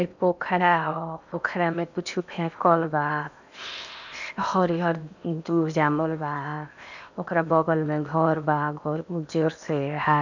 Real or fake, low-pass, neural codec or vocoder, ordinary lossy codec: fake; 7.2 kHz; codec, 16 kHz in and 24 kHz out, 0.8 kbps, FocalCodec, streaming, 65536 codes; none